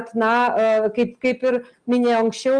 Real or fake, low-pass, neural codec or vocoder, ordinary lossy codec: real; 9.9 kHz; none; Opus, 32 kbps